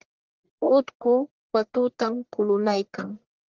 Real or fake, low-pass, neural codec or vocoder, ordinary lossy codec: fake; 7.2 kHz; codec, 44.1 kHz, 1.7 kbps, Pupu-Codec; Opus, 16 kbps